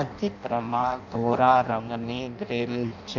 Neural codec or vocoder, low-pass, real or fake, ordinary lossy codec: codec, 16 kHz in and 24 kHz out, 0.6 kbps, FireRedTTS-2 codec; 7.2 kHz; fake; none